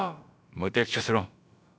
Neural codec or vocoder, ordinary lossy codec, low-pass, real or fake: codec, 16 kHz, about 1 kbps, DyCAST, with the encoder's durations; none; none; fake